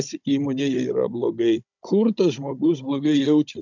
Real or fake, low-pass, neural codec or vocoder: fake; 7.2 kHz; codec, 16 kHz, 16 kbps, FunCodec, trained on Chinese and English, 50 frames a second